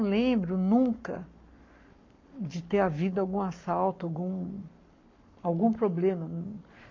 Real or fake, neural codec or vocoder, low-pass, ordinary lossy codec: fake; codec, 44.1 kHz, 7.8 kbps, Pupu-Codec; 7.2 kHz; MP3, 48 kbps